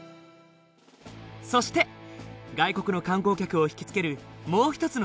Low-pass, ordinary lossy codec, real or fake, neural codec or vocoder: none; none; real; none